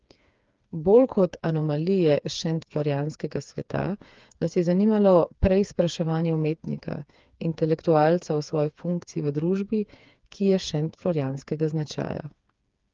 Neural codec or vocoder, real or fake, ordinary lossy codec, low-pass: codec, 16 kHz, 4 kbps, FreqCodec, smaller model; fake; Opus, 32 kbps; 7.2 kHz